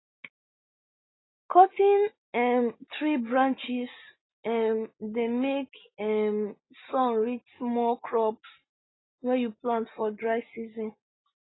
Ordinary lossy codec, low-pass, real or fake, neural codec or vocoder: AAC, 16 kbps; 7.2 kHz; real; none